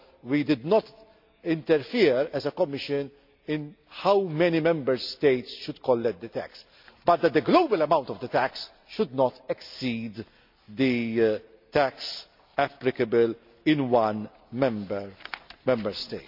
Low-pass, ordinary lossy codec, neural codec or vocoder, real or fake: 5.4 kHz; MP3, 48 kbps; none; real